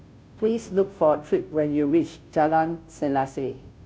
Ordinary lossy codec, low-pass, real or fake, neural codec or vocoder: none; none; fake; codec, 16 kHz, 0.5 kbps, FunCodec, trained on Chinese and English, 25 frames a second